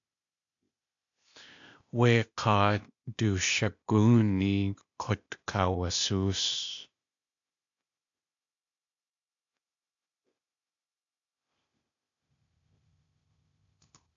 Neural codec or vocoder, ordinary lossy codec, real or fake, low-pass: codec, 16 kHz, 0.8 kbps, ZipCodec; AAC, 64 kbps; fake; 7.2 kHz